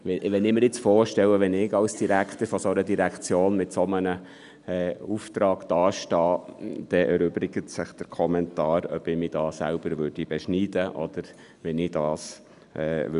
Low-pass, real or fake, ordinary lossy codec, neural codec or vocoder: 10.8 kHz; real; none; none